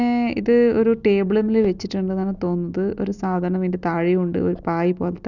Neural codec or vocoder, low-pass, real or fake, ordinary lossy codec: none; 7.2 kHz; real; none